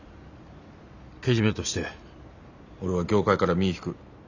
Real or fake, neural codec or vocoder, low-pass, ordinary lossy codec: real; none; 7.2 kHz; none